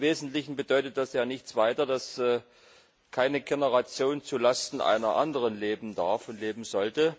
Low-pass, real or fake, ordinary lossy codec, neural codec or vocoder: none; real; none; none